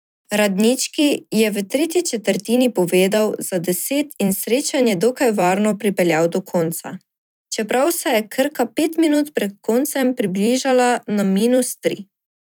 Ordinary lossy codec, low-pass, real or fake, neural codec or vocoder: none; none; fake; vocoder, 44.1 kHz, 128 mel bands every 256 samples, BigVGAN v2